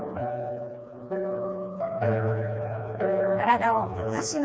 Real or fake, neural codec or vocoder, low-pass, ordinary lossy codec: fake; codec, 16 kHz, 2 kbps, FreqCodec, smaller model; none; none